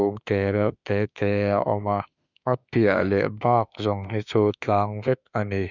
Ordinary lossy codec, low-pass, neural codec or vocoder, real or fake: none; 7.2 kHz; autoencoder, 48 kHz, 32 numbers a frame, DAC-VAE, trained on Japanese speech; fake